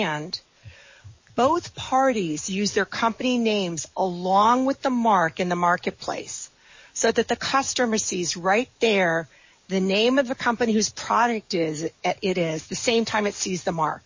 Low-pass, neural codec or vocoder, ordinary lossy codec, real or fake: 7.2 kHz; codec, 44.1 kHz, 7.8 kbps, DAC; MP3, 32 kbps; fake